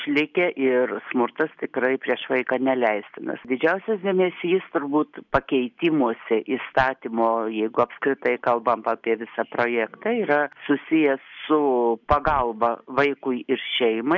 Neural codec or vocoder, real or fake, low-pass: none; real; 7.2 kHz